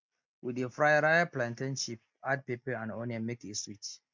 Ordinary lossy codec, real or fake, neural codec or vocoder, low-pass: MP3, 64 kbps; real; none; 7.2 kHz